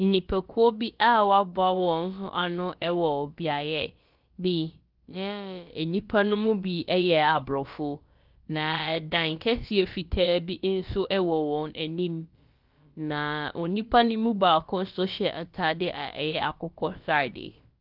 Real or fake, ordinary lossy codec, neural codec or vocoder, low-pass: fake; Opus, 24 kbps; codec, 16 kHz, about 1 kbps, DyCAST, with the encoder's durations; 5.4 kHz